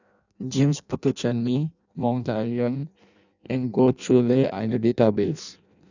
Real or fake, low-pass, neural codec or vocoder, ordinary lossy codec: fake; 7.2 kHz; codec, 16 kHz in and 24 kHz out, 0.6 kbps, FireRedTTS-2 codec; none